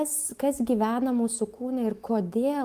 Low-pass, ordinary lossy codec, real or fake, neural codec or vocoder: 14.4 kHz; Opus, 24 kbps; fake; autoencoder, 48 kHz, 128 numbers a frame, DAC-VAE, trained on Japanese speech